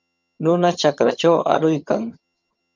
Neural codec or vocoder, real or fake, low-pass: vocoder, 22.05 kHz, 80 mel bands, HiFi-GAN; fake; 7.2 kHz